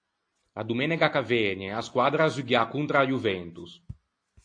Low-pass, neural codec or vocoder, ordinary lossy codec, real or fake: 9.9 kHz; none; AAC, 48 kbps; real